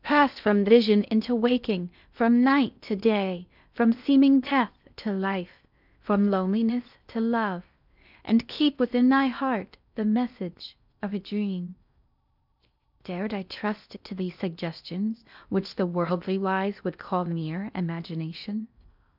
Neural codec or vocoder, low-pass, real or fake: codec, 16 kHz in and 24 kHz out, 0.6 kbps, FocalCodec, streaming, 4096 codes; 5.4 kHz; fake